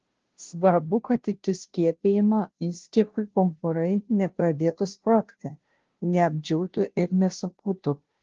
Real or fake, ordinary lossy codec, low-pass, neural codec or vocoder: fake; Opus, 16 kbps; 7.2 kHz; codec, 16 kHz, 0.5 kbps, FunCodec, trained on Chinese and English, 25 frames a second